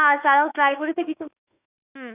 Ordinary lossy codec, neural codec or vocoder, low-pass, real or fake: none; autoencoder, 48 kHz, 32 numbers a frame, DAC-VAE, trained on Japanese speech; 3.6 kHz; fake